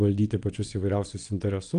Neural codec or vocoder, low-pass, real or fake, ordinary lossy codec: vocoder, 22.05 kHz, 80 mel bands, WaveNeXt; 9.9 kHz; fake; Opus, 32 kbps